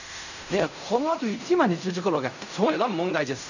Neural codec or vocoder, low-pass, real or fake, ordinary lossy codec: codec, 16 kHz in and 24 kHz out, 0.4 kbps, LongCat-Audio-Codec, fine tuned four codebook decoder; 7.2 kHz; fake; none